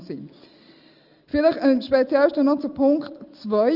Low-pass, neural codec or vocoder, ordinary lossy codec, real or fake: 5.4 kHz; none; Opus, 32 kbps; real